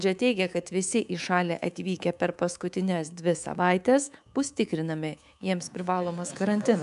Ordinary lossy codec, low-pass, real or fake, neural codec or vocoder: MP3, 96 kbps; 10.8 kHz; fake; codec, 24 kHz, 3.1 kbps, DualCodec